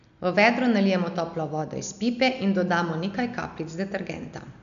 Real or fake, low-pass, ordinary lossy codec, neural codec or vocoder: real; 7.2 kHz; none; none